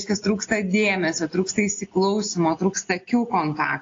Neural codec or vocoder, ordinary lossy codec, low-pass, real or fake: none; AAC, 32 kbps; 7.2 kHz; real